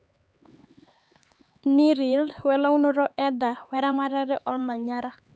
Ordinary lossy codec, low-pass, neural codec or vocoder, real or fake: none; none; codec, 16 kHz, 4 kbps, X-Codec, HuBERT features, trained on LibriSpeech; fake